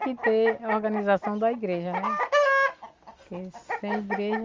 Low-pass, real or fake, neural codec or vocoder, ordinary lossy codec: 7.2 kHz; real; none; Opus, 32 kbps